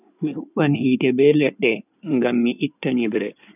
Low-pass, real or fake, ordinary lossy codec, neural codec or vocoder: 3.6 kHz; fake; none; codec, 16 kHz in and 24 kHz out, 2.2 kbps, FireRedTTS-2 codec